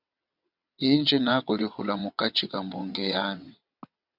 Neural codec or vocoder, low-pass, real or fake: vocoder, 22.05 kHz, 80 mel bands, WaveNeXt; 5.4 kHz; fake